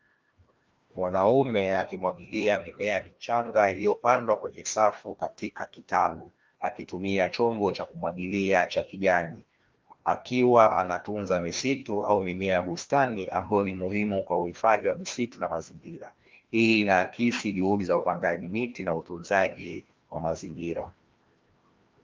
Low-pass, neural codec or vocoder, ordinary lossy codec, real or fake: 7.2 kHz; codec, 16 kHz, 1 kbps, FreqCodec, larger model; Opus, 32 kbps; fake